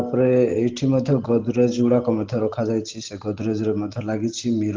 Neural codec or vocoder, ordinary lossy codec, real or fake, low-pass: none; Opus, 16 kbps; real; 7.2 kHz